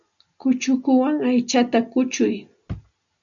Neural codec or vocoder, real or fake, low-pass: none; real; 7.2 kHz